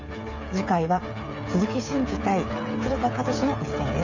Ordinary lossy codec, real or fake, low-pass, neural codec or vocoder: none; fake; 7.2 kHz; codec, 16 kHz, 8 kbps, FreqCodec, smaller model